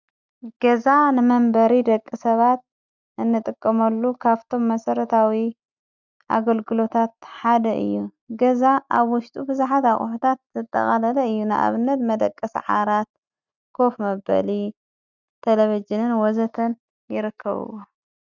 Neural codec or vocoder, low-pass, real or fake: none; 7.2 kHz; real